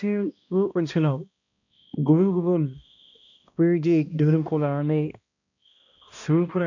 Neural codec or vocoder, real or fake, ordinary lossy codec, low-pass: codec, 16 kHz, 1 kbps, X-Codec, HuBERT features, trained on balanced general audio; fake; none; 7.2 kHz